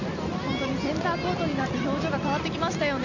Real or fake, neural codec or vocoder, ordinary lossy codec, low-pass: real; none; none; 7.2 kHz